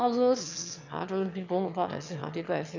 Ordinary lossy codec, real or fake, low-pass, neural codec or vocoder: none; fake; 7.2 kHz; autoencoder, 22.05 kHz, a latent of 192 numbers a frame, VITS, trained on one speaker